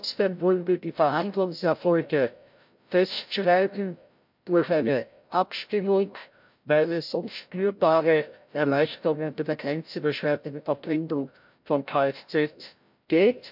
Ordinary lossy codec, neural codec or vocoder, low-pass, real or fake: MP3, 48 kbps; codec, 16 kHz, 0.5 kbps, FreqCodec, larger model; 5.4 kHz; fake